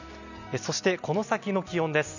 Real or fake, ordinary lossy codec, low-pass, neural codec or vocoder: real; none; 7.2 kHz; none